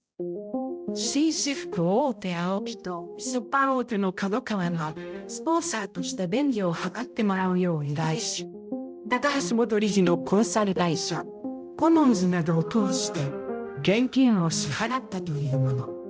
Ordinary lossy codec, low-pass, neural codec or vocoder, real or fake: none; none; codec, 16 kHz, 0.5 kbps, X-Codec, HuBERT features, trained on balanced general audio; fake